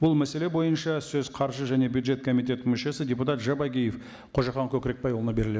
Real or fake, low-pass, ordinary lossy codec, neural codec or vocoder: real; none; none; none